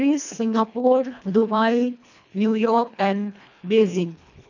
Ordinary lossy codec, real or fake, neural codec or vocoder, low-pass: none; fake; codec, 24 kHz, 1.5 kbps, HILCodec; 7.2 kHz